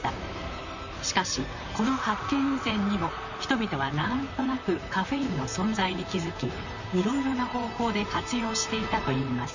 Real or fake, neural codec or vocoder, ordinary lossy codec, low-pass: fake; vocoder, 44.1 kHz, 128 mel bands, Pupu-Vocoder; none; 7.2 kHz